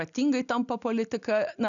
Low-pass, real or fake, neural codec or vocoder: 7.2 kHz; real; none